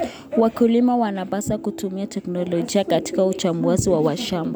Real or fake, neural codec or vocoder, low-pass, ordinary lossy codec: real; none; none; none